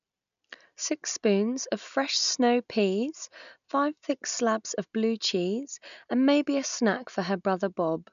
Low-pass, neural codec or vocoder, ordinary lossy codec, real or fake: 7.2 kHz; none; none; real